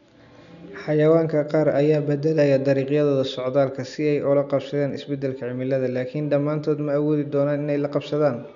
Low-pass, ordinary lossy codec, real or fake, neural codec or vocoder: 7.2 kHz; none; real; none